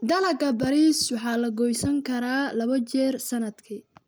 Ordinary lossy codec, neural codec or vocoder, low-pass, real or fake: none; none; none; real